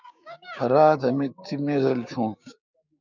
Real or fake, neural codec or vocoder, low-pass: fake; codec, 16 kHz, 6 kbps, DAC; 7.2 kHz